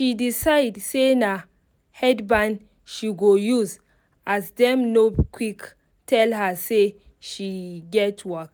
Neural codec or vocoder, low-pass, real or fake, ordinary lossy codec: none; none; real; none